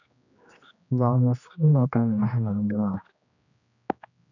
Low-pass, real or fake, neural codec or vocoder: 7.2 kHz; fake; codec, 16 kHz, 1 kbps, X-Codec, HuBERT features, trained on general audio